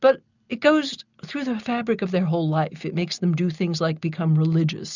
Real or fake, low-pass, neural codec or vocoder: real; 7.2 kHz; none